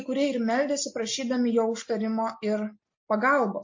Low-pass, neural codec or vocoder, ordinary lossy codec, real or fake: 7.2 kHz; none; MP3, 32 kbps; real